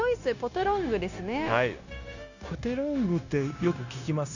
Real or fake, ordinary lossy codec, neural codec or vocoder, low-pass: fake; none; codec, 16 kHz, 0.9 kbps, LongCat-Audio-Codec; 7.2 kHz